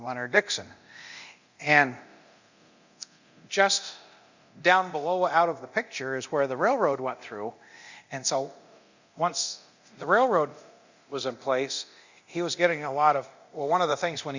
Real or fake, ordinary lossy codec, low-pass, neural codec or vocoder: fake; Opus, 64 kbps; 7.2 kHz; codec, 24 kHz, 0.9 kbps, DualCodec